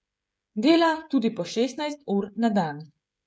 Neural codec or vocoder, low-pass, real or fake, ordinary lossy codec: codec, 16 kHz, 16 kbps, FreqCodec, smaller model; none; fake; none